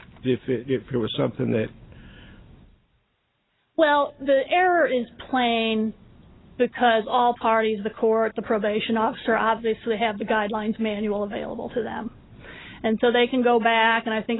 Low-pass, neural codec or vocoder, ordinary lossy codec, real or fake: 7.2 kHz; none; AAC, 16 kbps; real